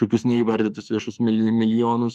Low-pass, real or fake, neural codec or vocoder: 14.4 kHz; fake; autoencoder, 48 kHz, 32 numbers a frame, DAC-VAE, trained on Japanese speech